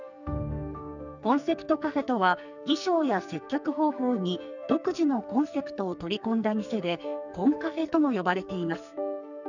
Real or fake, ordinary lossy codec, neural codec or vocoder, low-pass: fake; none; codec, 44.1 kHz, 2.6 kbps, SNAC; 7.2 kHz